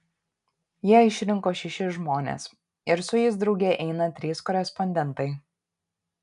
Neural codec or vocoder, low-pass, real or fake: none; 10.8 kHz; real